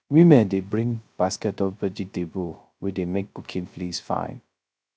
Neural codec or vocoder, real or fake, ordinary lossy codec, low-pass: codec, 16 kHz, 0.3 kbps, FocalCodec; fake; none; none